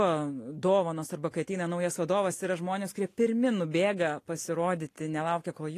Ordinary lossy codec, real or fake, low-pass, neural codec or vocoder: AAC, 48 kbps; real; 14.4 kHz; none